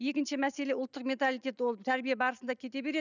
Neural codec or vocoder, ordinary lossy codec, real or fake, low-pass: none; none; real; 7.2 kHz